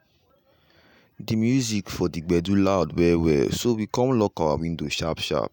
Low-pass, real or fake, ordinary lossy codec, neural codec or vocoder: none; real; none; none